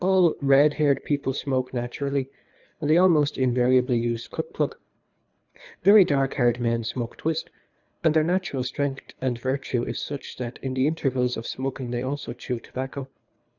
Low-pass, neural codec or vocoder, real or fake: 7.2 kHz; codec, 24 kHz, 3 kbps, HILCodec; fake